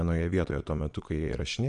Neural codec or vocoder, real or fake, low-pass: vocoder, 22.05 kHz, 80 mel bands, Vocos; fake; 9.9 kHz